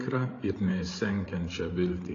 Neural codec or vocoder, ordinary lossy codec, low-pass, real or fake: codec, 16 kHz, 8 kbps, FreqCodec, larger model; AAC, 32 kbps; 7.2 kHz; fake